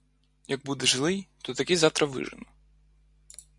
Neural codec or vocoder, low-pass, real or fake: none; 10.8 kHz; real